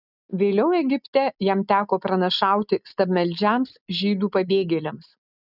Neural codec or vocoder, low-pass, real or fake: none; 5.4 kHz; real